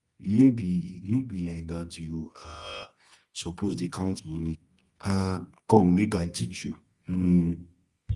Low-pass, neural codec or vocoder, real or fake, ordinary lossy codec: 10.8 kHz; codec, 24 kHz, 0.9 kbps, WavTokenizer, medium music audio release; fake; Opus, 32 kbps